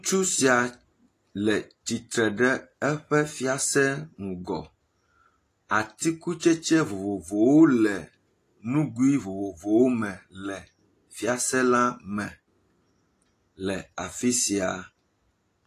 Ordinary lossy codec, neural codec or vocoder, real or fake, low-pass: AAC, 48 kbps; none; real; 14.4 kHz